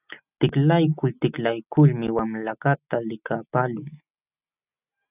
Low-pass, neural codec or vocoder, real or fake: 3.6 kHz; none; real